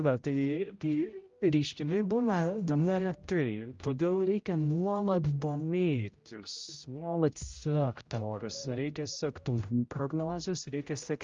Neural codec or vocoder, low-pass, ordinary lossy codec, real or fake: codec, 16 kHz, 0.5 kbps, X-Codec, HuBERT features, trained on general audio; 7.2 kHz; Opus, 32 kbps; fake